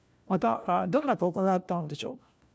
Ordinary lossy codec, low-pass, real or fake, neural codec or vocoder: none; none; fake; codec, 16 kHz, 1 kbps, FunCodec, trained on LibriTTS, 50 frames a second